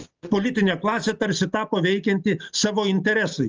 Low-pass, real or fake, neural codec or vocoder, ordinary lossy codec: 7.2 kHz; real; none; Opus, 24 kbps